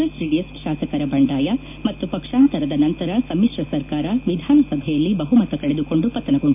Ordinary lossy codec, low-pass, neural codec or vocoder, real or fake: MP3, 32 kbps; 3.6 kHz; none; real